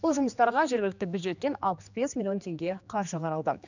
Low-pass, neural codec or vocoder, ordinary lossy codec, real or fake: 7.2 kHz; codec, 16 kHz, 2 kbps, X-Codec, HuBERT features, trained on general audio; none; fake